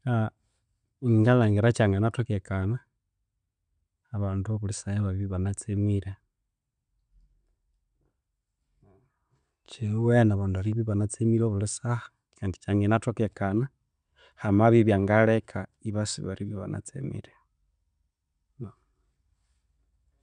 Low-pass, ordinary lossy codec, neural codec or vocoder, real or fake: 9.9 kHz; none; none; real